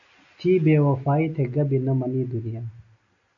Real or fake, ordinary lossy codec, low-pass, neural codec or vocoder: real; AAC, 64 kbps; 7.2 kHz; none